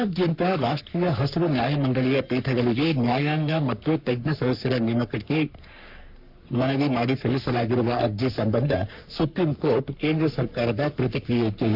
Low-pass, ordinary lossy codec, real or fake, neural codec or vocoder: 5.4 kHz; none; fake; codec, 44.1 kHz, 3.4 kbps, Pupu-Codec